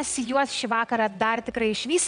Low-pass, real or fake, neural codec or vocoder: 9.9 kHz; fake; vocoder, 22.05 kHz, 80 mel bands, WaveNeXt